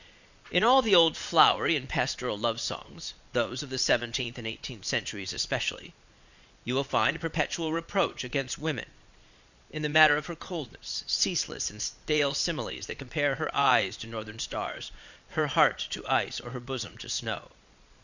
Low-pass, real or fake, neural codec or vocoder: 7.2 kHz; fake; vocoder, 22.05 kHz, 80 mel bands, Vocos